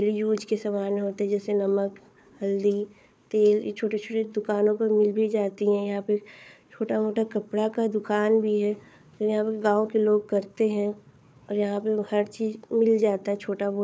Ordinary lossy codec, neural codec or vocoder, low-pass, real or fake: none; codec, 16 kHz, 4 kbps, FunCodec, trained on Chinese and English, 50 frames a second; none; fake